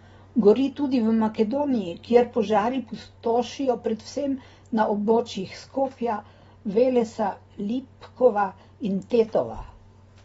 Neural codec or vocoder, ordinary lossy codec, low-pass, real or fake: none; AAC, 24 kbps; 19.8 kHz; real